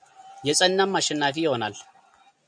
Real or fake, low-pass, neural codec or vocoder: real; 9.9 kHz; none